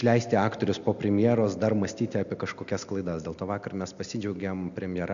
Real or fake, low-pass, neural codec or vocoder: real; 7.2 kHz; none